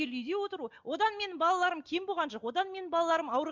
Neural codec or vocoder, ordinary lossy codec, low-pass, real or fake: none; MP3, 64 kbps; 7.2 kHz; real